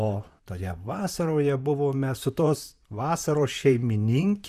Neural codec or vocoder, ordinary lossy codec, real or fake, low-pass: vocoder, 44.1 kHz, 128 mel bands, Pupu-Vocoder; Opus, 64 kbps; fake; 14.4 kHz